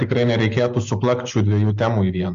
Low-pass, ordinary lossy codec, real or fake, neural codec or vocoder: 7.2 kHz; AAC, 64 kbps; real; none